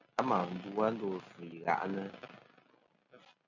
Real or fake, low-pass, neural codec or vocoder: real; 7.2 kHz; none